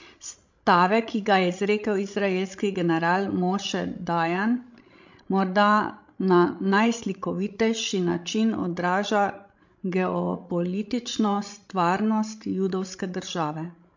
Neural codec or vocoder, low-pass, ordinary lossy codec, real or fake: codec, 16 kHz, 16 kbps, FreqCodec, larger model; 7.2 kHz; MP3, 48 kbps; fake